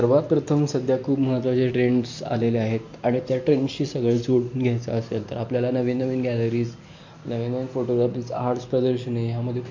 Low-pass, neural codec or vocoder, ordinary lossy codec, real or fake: 7.2 kHz; none; MP3, 48 kbps; real